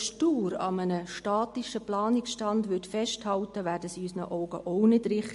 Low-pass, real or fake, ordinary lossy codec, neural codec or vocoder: 14.4 kHz; real; MP3, 48 kbps; none